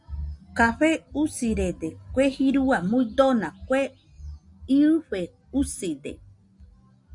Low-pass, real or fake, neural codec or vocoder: 10.8 kHz; real; none